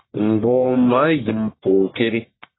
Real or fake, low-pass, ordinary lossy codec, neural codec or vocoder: fake; 7.2 kHz; AAC, 16 kbps; codec, 44.1 kHz, 1.7 kbps, Pupu-Codec